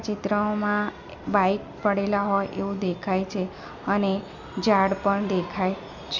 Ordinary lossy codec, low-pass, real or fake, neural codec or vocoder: MP3, 64 kbps; 7.2 kHz; real; none